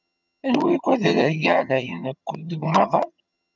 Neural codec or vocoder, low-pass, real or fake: vocoder, 22.05 kHz, 80 mel bands, HiFi-GAN; 7.2 kHz; fake